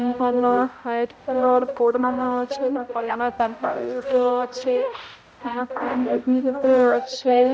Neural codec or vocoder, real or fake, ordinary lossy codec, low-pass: codec, 16 kHz, 0.5 kbps, X-Codec, HuBERT features, trained on balanced general audio; fake; none; none